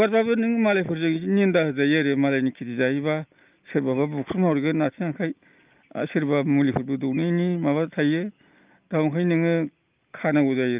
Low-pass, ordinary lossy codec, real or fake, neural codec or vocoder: 3.6 kHz; Opus, 24 kbps; real; none